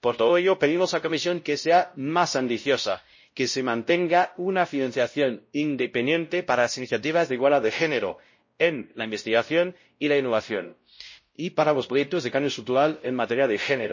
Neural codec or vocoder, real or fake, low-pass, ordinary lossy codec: codec, 16 kHz, 0.5 kbps, X-Codec, WavLM features, trained on Multilingual LibriSpeech; fake; 7.2 kHz; MP3, 32 kbps